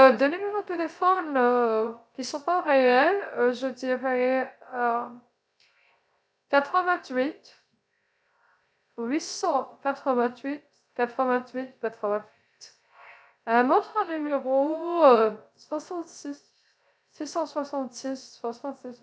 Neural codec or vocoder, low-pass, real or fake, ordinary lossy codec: codec, 16 kHz, 0.3 kbps, FocalCodec; none; fake; none